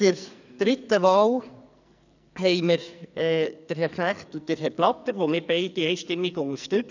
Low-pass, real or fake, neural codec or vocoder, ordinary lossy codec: 7.2 kHz; fake; codec, 44.1 kHz, 2.6 kbps, SNAC; none